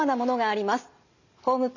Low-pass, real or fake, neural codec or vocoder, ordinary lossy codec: 7.2 kHz; real; none; none